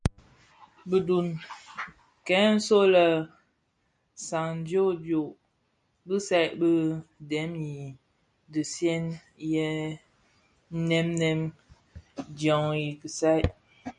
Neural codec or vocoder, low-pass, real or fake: none; 9.9 kHz; real